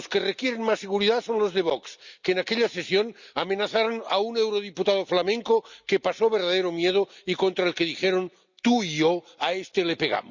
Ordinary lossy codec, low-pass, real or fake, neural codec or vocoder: Opus, 64 kbps; 7.2 kHz; real; none